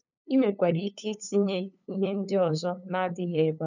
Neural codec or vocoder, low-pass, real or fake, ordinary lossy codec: codec, 16 kHz, 8 kbps, FunCodec, trained on LibriTTS, 25 frames a second; 7.2 kHz; fake; none